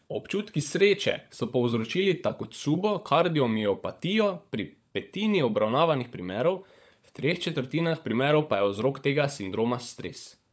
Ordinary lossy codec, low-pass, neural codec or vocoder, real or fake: none; none; codec, 16 kHz, 16 kbps, FunCodec, trained on LibriTTS, 50 frames a second; fake